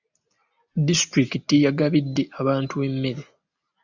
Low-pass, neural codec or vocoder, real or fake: 7.2 kHz; none; real